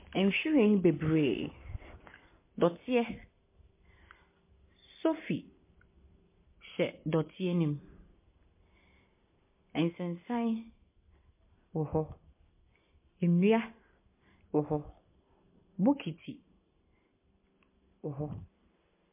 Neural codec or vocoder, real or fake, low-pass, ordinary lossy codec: none; real; 3.6 kHz; MP3, 24 kbps